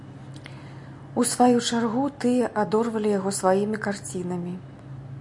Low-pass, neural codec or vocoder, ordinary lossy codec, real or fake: 10.8 kHz; none; MP3, 64 kbps; real